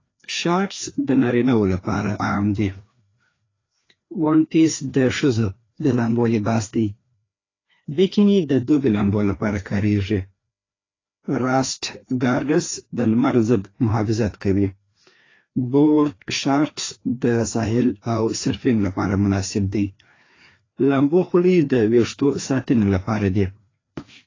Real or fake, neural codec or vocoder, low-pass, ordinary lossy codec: fake; codec, 16 kHz, 2 kbps, FreqCodec, larger model; 7.2 kHz; AAC, 32 kbps